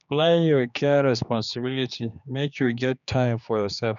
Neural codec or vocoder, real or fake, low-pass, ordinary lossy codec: codec, 16 kHz, 2 kbps, X-Codec, HuBERT features, trained on general audio; fake; 7.2 kHz; none